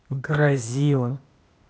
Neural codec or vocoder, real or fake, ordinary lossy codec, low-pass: codec, 16 kHz, 0.8 kbps, ZipCodec; fake; none; none